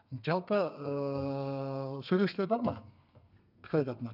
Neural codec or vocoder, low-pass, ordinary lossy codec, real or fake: codec, 32 kHz, 1.9 kbps, SNAC; 5.4 kHz; none; fake